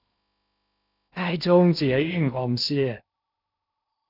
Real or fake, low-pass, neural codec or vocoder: fake; 5.4 kHz; codec, 16 kHz in and 24 kHz out, 0.6 kbps, FocalCodec, streaming, 2048 codes